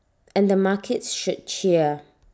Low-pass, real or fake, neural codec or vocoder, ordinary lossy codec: none; real; none; none